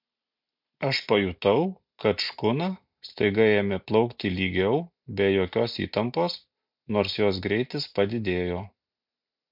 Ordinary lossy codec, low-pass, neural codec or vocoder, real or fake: MP3, 48 kbps; 5.4 kHz; none; real